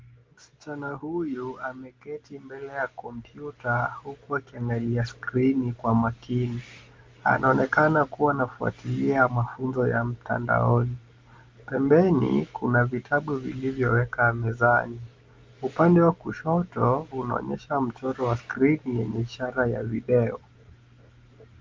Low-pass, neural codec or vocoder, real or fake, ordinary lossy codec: 7.2 kHz; none; real; Opus, 32 kbps